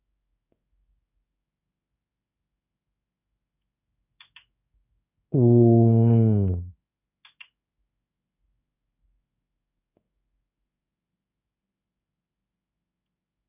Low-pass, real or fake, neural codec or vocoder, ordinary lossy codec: 3.6 kHz; fake; codec, 16 kHz in and 24 kHz out, 1 kbps, XY-Tokenizer; none